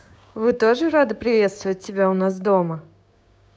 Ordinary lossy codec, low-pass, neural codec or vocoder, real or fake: none; none; codec, 16 kHz, 6 kbps, DAC; fake